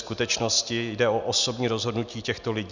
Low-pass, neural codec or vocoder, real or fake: 7.2 kHz; none; real